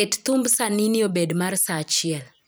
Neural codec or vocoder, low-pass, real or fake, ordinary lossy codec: vocoder, 44.1 kHz, 128 mel bands every 256 samples, BigVGAN v2; none; fake; none